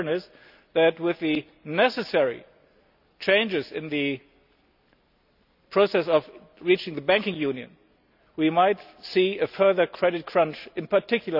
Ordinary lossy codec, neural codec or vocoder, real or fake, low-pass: none; none; real; 5.4 kHz